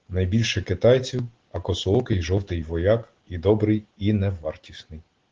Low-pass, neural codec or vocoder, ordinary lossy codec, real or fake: 7.2 kHz; none; Opus, 16 kbps; real